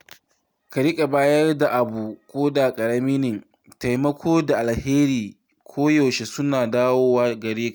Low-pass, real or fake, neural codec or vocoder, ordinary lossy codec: none; real; none; none